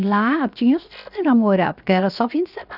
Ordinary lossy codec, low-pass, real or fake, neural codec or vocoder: none; 5.4 kHz; fake; codec, 16 kHz, 0.7 kbps, FocalCodec